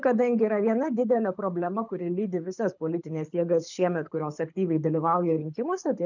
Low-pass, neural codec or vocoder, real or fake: 7.2 kHz; codec, 24 kHz, 6 kbps, HILCodec; fake